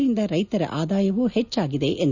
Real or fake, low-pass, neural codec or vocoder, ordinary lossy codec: real; 7.2 kHz; none; none